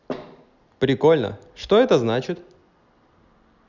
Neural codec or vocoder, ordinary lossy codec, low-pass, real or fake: none; none; 7.2 kHz; real